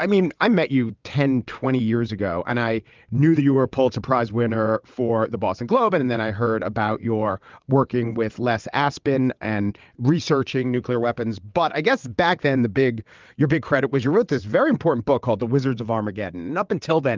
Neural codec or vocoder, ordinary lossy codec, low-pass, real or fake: vocoder, 22.05 kHz, 80 mel bands, WaveNeXt; Opus, 24 kbps; 7.2 kHz; fake